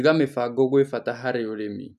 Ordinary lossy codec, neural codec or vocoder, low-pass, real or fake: none; none; 14.4 kHz; real